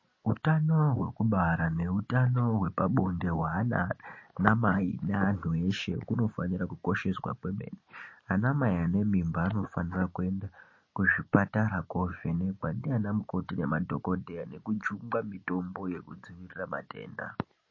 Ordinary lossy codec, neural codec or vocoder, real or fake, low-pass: MP3, 32 kbps; none; real; 7.2 kHz